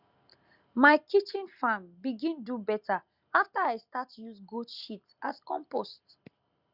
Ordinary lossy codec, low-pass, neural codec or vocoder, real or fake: Opus, 64 kbps; 5.4 kHz; none; real